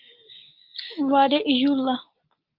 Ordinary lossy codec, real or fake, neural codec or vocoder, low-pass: Opus, 24 kbps; real; none; 5.4 kHz